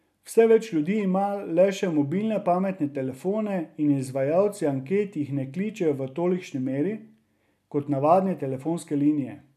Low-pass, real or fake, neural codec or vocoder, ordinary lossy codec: 14.4 kHz; real; none; none